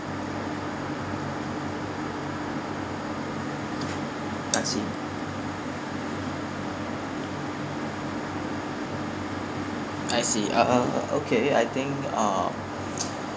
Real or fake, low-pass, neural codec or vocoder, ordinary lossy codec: real; none; none; none